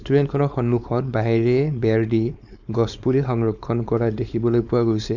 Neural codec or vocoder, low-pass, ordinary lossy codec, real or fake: codec, 16 kHz, 4.8 kbps, FACodec; 7.2 kHz; none; fake